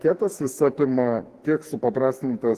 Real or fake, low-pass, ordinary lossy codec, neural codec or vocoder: fake; 14.4 kHz; Opus, 16 kbps; codec, 44.1 kHz, 2.6 kbps, SNAC